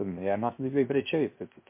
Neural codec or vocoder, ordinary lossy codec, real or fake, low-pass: codec, 16 kHz, 0.3 kbps, FocalCodec; MP3, 24 kbps; fake; 3.6 kHz